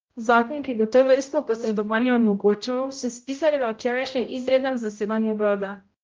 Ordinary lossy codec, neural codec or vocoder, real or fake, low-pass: Opus, 24 kbps; codec, 16 kHz, 0.5 kbps, X-Codec, HuBERT features, trained on general audio; fake; 7.2 kHz